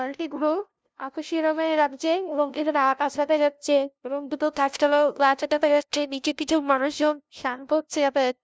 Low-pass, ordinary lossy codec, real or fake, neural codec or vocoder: none; none; fake; codec, 16 kHz, 0.5 kbps, FunCodec, trained on LibriTTS, 25 frames a second